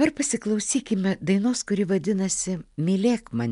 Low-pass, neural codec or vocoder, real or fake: 10.8 kHz; none; real